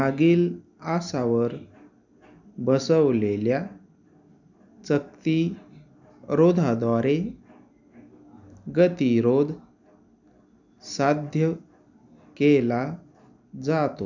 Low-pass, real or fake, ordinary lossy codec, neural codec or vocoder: 7.2 kHz; real; none; none